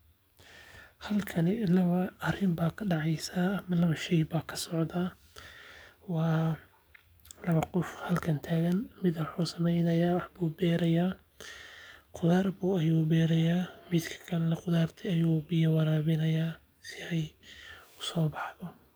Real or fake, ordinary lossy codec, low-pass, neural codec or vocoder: fake; none; none; codec, 44.1 kHz, 7.8 kbps, DAC